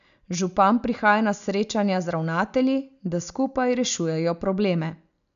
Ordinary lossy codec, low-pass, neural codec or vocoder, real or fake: none; 7.2 kHz; none; real